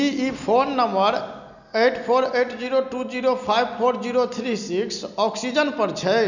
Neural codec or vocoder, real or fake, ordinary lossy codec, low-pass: none; real; none; 7.2 kHz